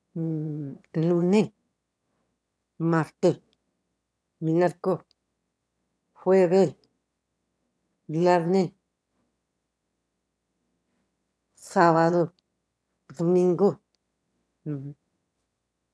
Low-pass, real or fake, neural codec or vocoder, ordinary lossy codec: none; fake; autoencoder, 22.05 kHz, a latent of 192 numbers a frame, VITS, trained on one speaker; none